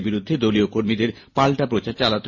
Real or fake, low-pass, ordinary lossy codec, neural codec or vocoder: real; 7.2 kHz; MP3, 32 kbps; none